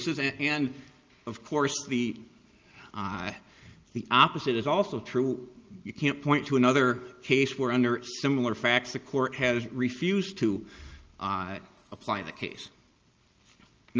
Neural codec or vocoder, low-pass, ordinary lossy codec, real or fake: none; 7.2 kHz; Opus, 24 kbps; real